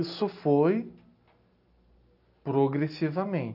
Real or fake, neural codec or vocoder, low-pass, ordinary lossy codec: real; none; 5.4 kHz; none